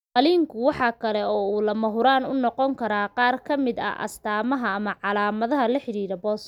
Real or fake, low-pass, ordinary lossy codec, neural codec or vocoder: real; 19.8 kHz; none; none